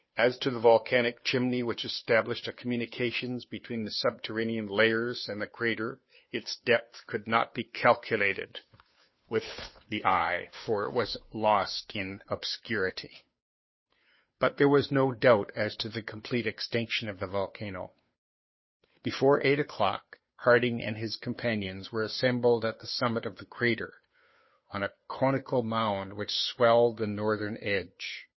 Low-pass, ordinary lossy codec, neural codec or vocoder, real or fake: 7.2 kHz; MP3, 24 kbps; codec, 16 kHz, 2 kbps, FunCodec, trained on Chinese and English, 25 frames a second; fake